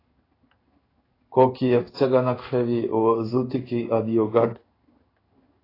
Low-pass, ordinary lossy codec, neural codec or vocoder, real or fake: 5.4 kHz; AAC, 24 kbps; codec, 16 kHz in and 24 kHz out, 1 kbps, XY-Tokenizer; fake